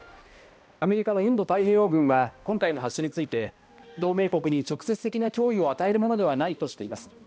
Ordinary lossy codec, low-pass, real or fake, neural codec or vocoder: none; none; fake; codec, 16 kHz, 1 kbps, X-Codec, HuBERT features, trained on balanced general audio